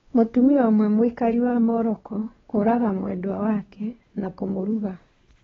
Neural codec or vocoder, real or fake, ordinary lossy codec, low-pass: codec, 16 kHz, 2 kbps, X-Codec, WavLM features, trained on Multilingual LibriSpeech; fake; AAC, 24 kbps; 7.2 kHz